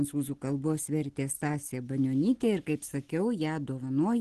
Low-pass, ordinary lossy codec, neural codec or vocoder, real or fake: 10.8 kHz; Opus, 16 kbps; none; real